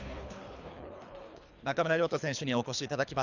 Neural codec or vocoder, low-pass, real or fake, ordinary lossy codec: codec, 24 kHz, 3 kbps, HILCodec; 7.2 kHz; fake; Opus, 64 kbps